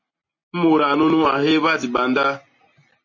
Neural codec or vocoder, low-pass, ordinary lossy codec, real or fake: none; 7.2 kHz; MP3, 32 kbps; real